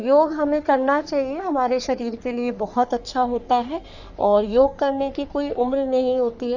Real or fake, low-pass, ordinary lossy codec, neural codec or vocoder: fake; 7.2 kHz; none; codec, 44.1 kHz, 3.4 kbps, Pupu-Codec